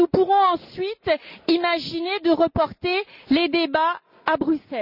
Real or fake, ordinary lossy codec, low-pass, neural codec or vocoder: real; none; 5.4 kHz; none